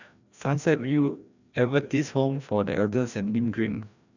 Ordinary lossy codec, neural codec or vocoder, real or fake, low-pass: none; codec, 16 kHz, 1 kbps, FreqCodec, larger model; fake; 7.2 kHz